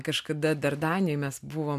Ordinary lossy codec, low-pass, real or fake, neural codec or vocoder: AAC, 96 kbps; 14.4 kHz; real; none